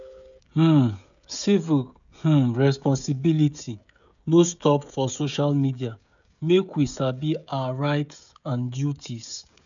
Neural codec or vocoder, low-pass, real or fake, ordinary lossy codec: codec, 16 kHz, 16 kbps, FreqCodec, smaller model; 7.2 kHz; fake; none